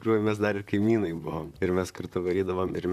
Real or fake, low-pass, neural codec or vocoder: fake; 14.4 kHz; vocoder, 44.1 kHz, 128 mel bands, Pupu-Vocoder